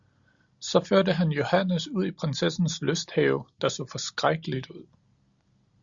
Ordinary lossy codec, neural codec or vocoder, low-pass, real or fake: Opus, 64 kbps; none; 7.2 kHz; real